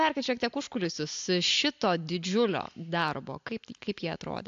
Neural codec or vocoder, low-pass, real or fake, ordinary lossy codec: none; 7.2 kHz; real; AAC, 64 kbps